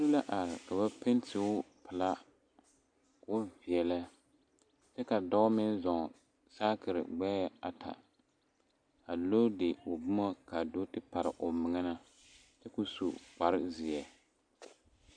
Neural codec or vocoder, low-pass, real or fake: none; 9.9 kHz; real